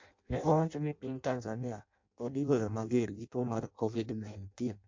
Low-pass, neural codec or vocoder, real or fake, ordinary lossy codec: 7.2 kHz; codec, 16 kHz in and 24 kHz out, 0.6 kbps, FireRedTTS-2 codec; fake; MP3, 48 kbps